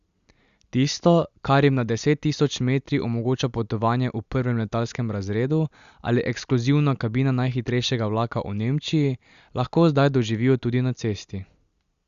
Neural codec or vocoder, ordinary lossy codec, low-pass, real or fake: none; Opus, 64 kbps; 7.2 kHz; real